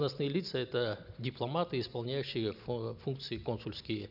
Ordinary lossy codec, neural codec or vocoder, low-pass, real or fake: none; none; 5.4 kHz; real